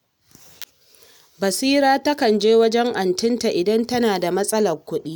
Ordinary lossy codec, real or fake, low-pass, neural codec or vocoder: none; real; none; none